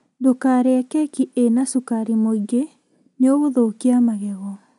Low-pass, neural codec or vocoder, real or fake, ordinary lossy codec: 10.8 kHz; none; real; none